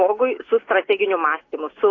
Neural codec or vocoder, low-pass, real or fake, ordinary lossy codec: none; 7.2 kHz; real; AAC, 32 kbps